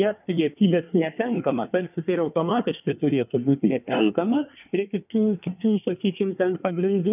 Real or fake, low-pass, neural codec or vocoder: fake; 3.6 kHz; codec, 24 kHz, 1 kbps, SNAC